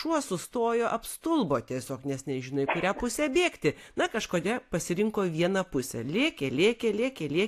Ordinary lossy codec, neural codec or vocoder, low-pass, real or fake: AAC, 64 kbps; none; 14.4 kHz; real